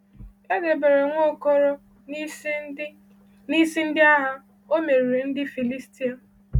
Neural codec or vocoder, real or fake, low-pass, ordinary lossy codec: none; real; 19.8 kHz; none